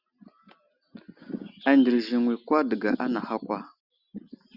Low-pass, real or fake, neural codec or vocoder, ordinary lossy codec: 5.4 kHz; real; none; Opus, 64 kbps